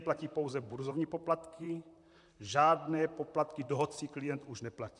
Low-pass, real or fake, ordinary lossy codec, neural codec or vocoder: 10.8 kHz; fake; MP3, 96 kbps; vocoder, 44.1 kHz, 128 mel bands, Pupu-Vocoder